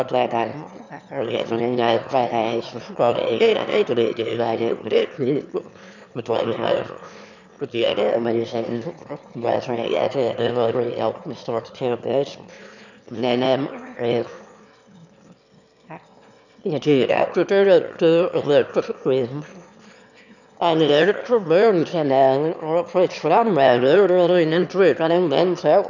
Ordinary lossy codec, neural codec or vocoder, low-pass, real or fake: none; autoencoder, 22.05 kHz, a latent of 192 numbers a frame, VITS, trained on one speaker; 7.2 kHz; fake